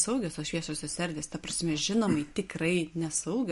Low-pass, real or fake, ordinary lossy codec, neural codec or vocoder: 14.4 kHz; real; MP3, 48 kbps; none